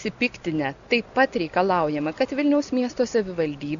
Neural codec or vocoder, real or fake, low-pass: none; real; 7.2 kHz